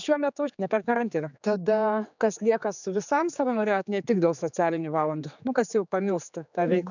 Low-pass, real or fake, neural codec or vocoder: 7.2 kHz; fake; codec, 16 kHz, 4 kbps, X-Codec, HuBERT features, trained on general audio